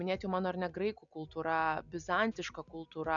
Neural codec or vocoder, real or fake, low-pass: none; real; 7.2 kHz